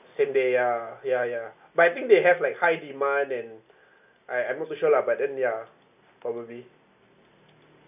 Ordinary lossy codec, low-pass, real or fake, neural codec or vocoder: none; 3.6 kHz; real; none